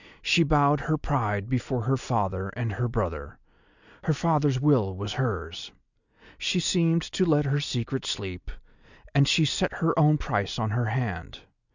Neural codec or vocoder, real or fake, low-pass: none; real; 7.2 kHz